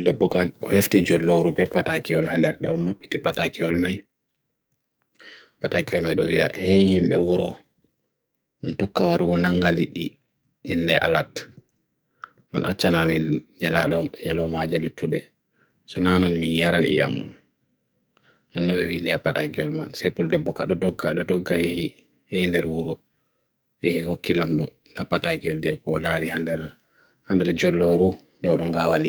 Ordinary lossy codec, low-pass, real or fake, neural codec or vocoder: none; none; fake; codec, 44.1 kHz, 2.6 kbps, SNAC